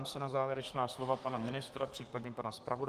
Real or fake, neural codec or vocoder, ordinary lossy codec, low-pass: fake; codec, 32 kHz, 1.9 kbps, SNAC; Opus, 24 kbps; 14.4 kHz